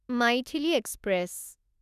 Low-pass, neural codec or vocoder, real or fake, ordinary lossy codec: 14.4 kHz; autoencoder, 48 kHz, 32 numbers a frame, DAC-VAE, trained on Japanese speech; fake; none